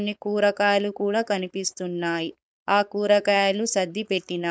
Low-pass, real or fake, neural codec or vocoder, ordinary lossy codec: none; fake; codec, 16 kHz, 4.8 kbps, FACodec; none